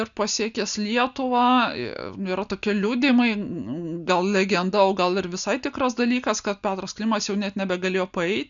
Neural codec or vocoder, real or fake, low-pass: none; real; 7.2 kHz